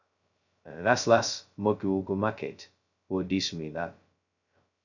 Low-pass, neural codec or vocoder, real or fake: 7.2 kHz; codec, 16 kHz, 0.2 kbps, FocalCodec; fake